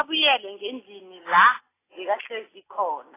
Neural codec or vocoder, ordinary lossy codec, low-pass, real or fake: none; AAC, 16 kbps; 3.6 kHz; real